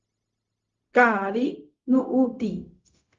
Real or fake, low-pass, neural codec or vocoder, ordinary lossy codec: fake; 7.2 kHz; codec, 16 kHz, 0.4 kbps, LongCat-Audio-Codec; Opus, 32 kbps